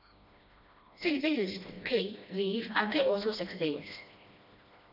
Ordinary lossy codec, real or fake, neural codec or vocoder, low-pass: none; fake; codec, 16 kHz, 1 kbps, FreqCodec, smaller model; 5.4 kHz